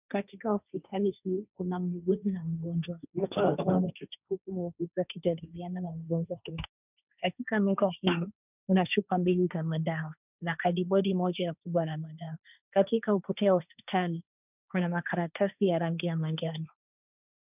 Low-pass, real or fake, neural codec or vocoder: 3.6 kHz; fake; codec, 16 kHz, 1.1 kbps, Voila-Tokenizer